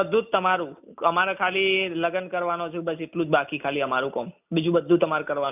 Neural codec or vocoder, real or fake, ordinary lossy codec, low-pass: none; real; none; 3.6 kHz